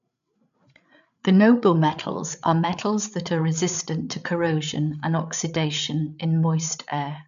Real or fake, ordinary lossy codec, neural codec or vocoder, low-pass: fake; none; codec, 16 kHz, 8 kbps, FreqCodec, larger model; 7.2 kHz